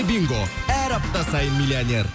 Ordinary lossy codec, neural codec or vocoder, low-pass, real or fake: none; none; none; real